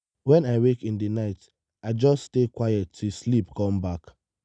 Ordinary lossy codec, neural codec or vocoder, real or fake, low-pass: none; none; real; 9.9 kHz